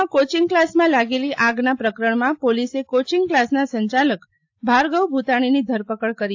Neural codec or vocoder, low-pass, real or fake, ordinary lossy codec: none; 7.2 kHz; real; AAC, 48 kbps